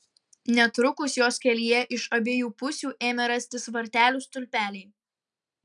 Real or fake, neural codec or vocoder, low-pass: real; none; 10.8 kHz